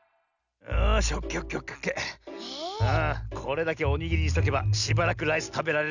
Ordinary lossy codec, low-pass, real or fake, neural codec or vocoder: none; 7.2 kHz; real; none